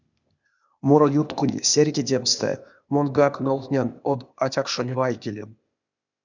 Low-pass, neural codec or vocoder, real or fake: 7.2 kHz; codec, 16 kHz, 0.8 kbps, ZipCodec; fake